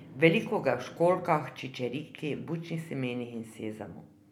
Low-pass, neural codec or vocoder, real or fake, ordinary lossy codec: 19.8 kHz; none; real; none